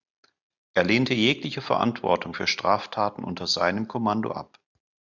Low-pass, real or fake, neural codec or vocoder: 7.2 kHz; real; none